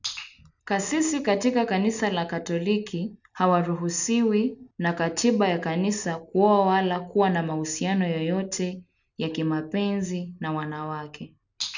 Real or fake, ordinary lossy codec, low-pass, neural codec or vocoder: real; none; 7.2 kHz; none